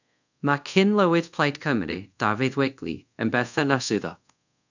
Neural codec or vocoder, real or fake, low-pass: codec, 24 kHz, 0.5 kbps, DualCodec; fake; 7.2 kHz